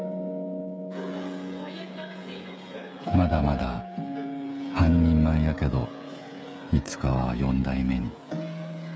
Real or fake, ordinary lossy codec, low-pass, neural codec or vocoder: fake; none; none; codec, 16 kHz, 16 kbps, FreqCodec, smaller model